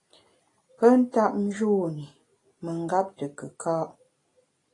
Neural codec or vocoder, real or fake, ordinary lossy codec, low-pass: none; real; AAC, 32 kbps; 10.8 kHz